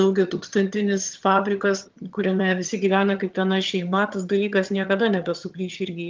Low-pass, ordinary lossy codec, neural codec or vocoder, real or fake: 7.2 kHz; Opus, 24 kbps; vocoder, 22.05 kHz, 80 mel bands, HiFi-GAN; fake